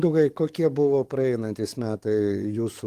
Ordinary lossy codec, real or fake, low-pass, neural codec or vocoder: Opus, 24 kbps; fake; 14.4 kHz; codec, 44.1 kHz, 7.8 kbps, DAC